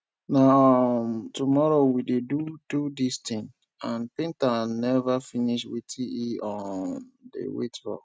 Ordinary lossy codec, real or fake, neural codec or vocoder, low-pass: none; real; none; none